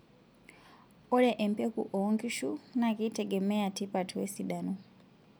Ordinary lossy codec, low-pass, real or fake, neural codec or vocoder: none; none; real; none